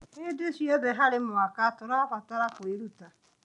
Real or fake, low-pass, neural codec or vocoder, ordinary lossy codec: real; 10.8 kHz; none; none